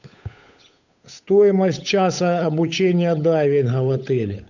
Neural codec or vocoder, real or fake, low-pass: codec, 16 kHz, 8 kbps, FunCodec, trained on Chinese and English, 25 frames a second; fake; 7.2 kHz